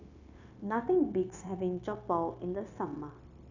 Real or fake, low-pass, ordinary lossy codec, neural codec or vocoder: fake; 7.2 kHz; none; codec, 16 kHz, 0.9 kbps, LongCat-Audio-Codec